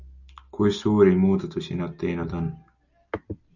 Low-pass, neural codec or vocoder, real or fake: 7.2 kHz; none; real